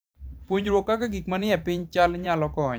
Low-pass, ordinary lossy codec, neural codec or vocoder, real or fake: none; none; vocoder, 44.1 kHz, 128 mel bands every 512 samples, BigVGAN v2; fake